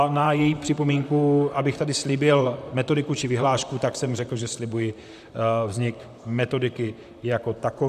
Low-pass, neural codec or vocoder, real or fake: 14.4 kHz; vocoder, 44.1 kHz, 128 mel bands, Pupu-Vocoder; fake